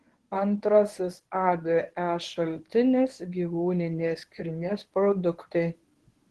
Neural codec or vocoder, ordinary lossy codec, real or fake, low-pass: codec, 24 kHz, 0.9 kbps, WavTokenizer, medium speech release version 1; Opus, 16 kbps; fake; 10.8 kHz